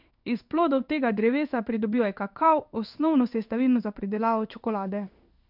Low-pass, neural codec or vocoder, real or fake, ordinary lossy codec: 5.4 kHz; codec, 16 kHz in and 24 kHz out, 1 kbps, XY-Tokenizer; fake; none